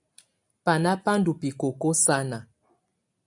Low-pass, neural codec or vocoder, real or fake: 10.8 kHz; none; real